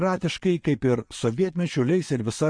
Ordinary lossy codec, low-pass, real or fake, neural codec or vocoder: MP3, 48 kbps; 9.9 kHz; fake; vocoder, 22.05 kHz, 80 mel bands, WaveNeXt